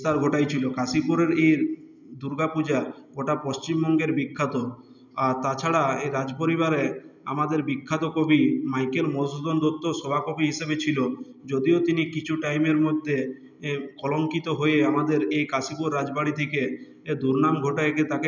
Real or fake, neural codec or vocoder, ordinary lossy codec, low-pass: real; none; none; 7.2 kHz